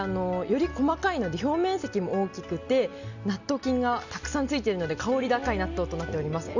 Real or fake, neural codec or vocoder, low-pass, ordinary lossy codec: real; none; 7.2 kHz; none